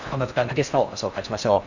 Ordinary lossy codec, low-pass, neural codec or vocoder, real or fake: none; 7.2 kHz; codec, 16 kHz in and 24 kHz out, 0.6 kbps, FocalCodec, streaming, 4096 codes; fake